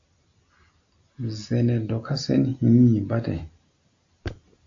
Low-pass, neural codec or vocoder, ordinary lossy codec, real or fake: 7.2 kHz; none; AAC, 48 kbps; real